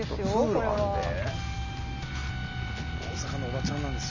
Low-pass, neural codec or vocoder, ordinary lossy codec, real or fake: 7.2 kHz; none; none; real